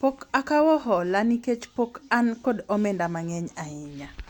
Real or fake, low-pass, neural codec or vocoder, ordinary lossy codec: real; 19.8 kHz; none; Opus, 64 kbps